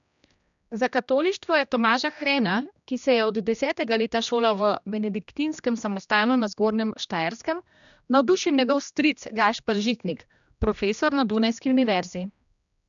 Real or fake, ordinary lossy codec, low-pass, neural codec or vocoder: fake; none; 7.2 kHz; codec, 16 kHz, 1 kbps, X-Codec, HuBERT features, trained on general audio